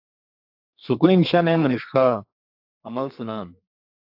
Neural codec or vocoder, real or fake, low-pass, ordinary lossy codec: codec, 32 kHz, 1.9 kbps, SNAC; fake; 5.4 kHz; AAC, 48 kbps